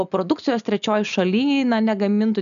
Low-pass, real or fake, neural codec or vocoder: 7.2 kHz; real; none